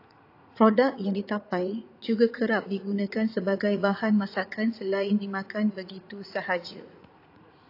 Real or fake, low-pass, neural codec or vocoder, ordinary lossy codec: fake; 5.4 kHz; vocoder, 44.1 kHz, 80 mel bands, Vocos; AAC, 32 kbps